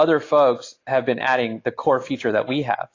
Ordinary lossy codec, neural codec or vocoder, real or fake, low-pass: AAC, 32 kbps; none; real; 7.2 kHz